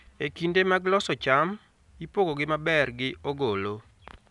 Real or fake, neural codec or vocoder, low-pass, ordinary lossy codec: real; none; 10.8 kHz; none